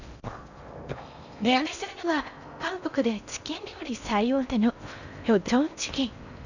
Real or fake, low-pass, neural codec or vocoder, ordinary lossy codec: fake; 7.2 kHz; codec, 16 kHz in and 24 kHz out, 0.6 kbps, FocalCodec, streaming, 4096 codes; none